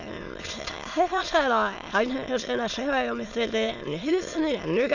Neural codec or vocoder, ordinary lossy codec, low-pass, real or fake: autoencoder, 22.05 kHz, a latent of 192 numbers a frame, VITS, trained on many speakers; none; 7.2 kHz; fake